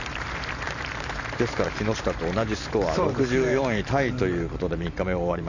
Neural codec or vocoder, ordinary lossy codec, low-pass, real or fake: vocoder, 44.1 kHz, 128 mel bands every 512 samples, BigVGAN v2; none; 7.2 kHz; fake